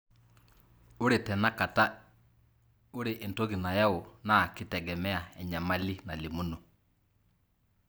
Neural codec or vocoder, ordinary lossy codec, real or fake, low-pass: none; none; real; none